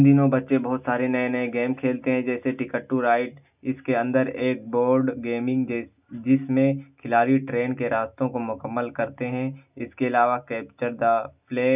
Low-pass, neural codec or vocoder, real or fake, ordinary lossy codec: 3.6 kHz; none; real; none